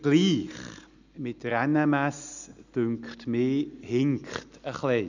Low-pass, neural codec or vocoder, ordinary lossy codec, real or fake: 7.2 kHz; none; none; real